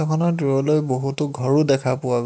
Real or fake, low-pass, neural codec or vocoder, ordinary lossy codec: real; none; none; none